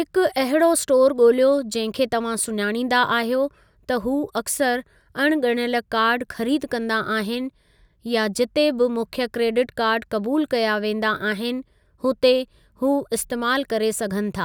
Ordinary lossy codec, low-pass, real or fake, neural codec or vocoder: none; none; real; none